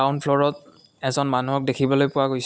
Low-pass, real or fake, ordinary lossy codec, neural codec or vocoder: none; real; none; none